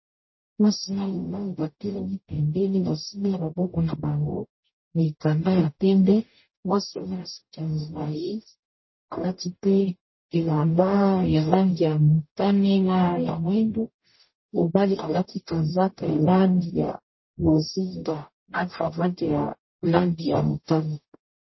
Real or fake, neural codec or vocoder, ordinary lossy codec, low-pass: fake; codec, 44.1 kHz, 0.9 kbps, DAC; MP3, 24 kbps; 7.2 kHz